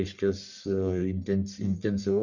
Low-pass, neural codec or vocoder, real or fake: 7.2 kHz; codec, 44.1 kHz, 1.7 kbps, Pupu-Codec; fake